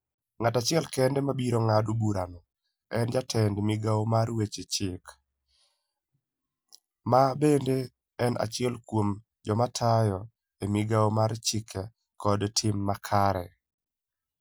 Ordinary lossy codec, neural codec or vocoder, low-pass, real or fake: none; none; none; real